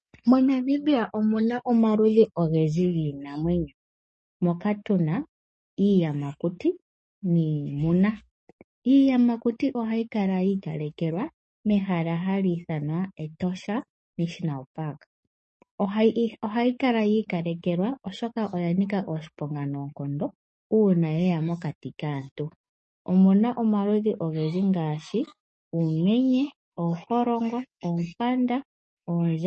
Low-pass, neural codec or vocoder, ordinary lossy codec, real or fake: 10.8 kHz; codec, 44.1 kHz, 7.8 kbps, DAC; MP3, 32 kbps; fake